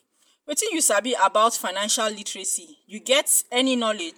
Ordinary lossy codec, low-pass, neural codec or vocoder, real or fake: none; none; none; real